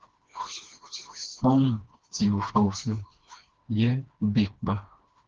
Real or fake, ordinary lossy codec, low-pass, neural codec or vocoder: fake; Opus, 16 kbps; 7.2 kHz; codec, 16 kHz, 2 kbps, FreqCodec, smaller model